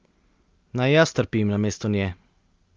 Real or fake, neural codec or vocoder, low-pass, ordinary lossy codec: real; none; 7.2 kHz; Opus, 24 kbps